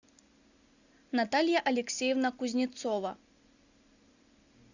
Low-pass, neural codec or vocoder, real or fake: 7.2 kHz; none; real